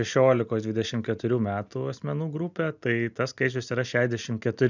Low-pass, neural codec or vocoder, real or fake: 7.2 kHz; none; real